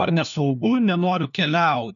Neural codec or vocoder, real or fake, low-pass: codec, 16 kHz, 1 kbps, FunCodec, trained on LibriTTS, 50 frames a second; fake; 7.2 kHz